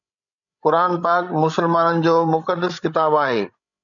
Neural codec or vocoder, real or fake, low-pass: codec, 16 kHz, 16 kbps, FreqCodec, larger model; fake; 7.2 kHz